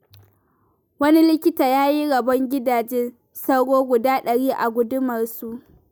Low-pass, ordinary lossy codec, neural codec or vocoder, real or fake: none; none; none; real